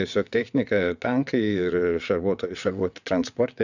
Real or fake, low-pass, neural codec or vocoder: fake; 7.2 kHz; vocoder, 22.05 kHz, 80 mel bands, WaveNeXt